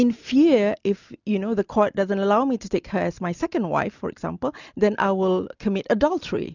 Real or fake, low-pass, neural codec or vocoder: real; 7.2 kHz; none